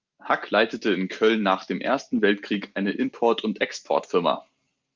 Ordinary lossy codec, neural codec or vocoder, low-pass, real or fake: Opus, 24 kbps; none; 7.2 kHz; real